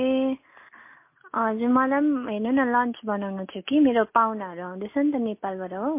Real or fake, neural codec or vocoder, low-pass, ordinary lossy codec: real; none; 3.6 kHz; MP3, 24 kbps